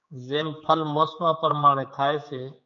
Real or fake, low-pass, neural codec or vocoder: fake; 7.2 kHz; codec, 16 kHz, 4 kbps, X-Codec, HuBERT features, trained on general audio